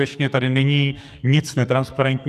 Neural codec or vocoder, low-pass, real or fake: codec, 44.1 kHz, 2.6 kbps, SNAC; 14.4 kHz; fake